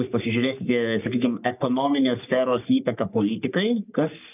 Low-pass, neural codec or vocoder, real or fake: 3.6 kHz; codec, 44.1 kHz, 3.4 kbps, Pupu-Codec; fake